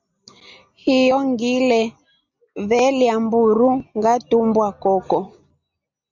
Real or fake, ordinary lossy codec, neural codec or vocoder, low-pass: real; Opus, 64 kbps; none; 7.2 kHz